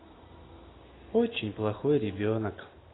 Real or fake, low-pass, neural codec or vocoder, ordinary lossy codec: real; 7.2 kHz; none; AAC, 16 kbps